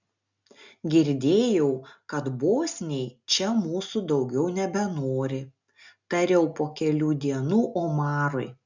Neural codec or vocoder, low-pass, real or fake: none; 7.2 kHz; real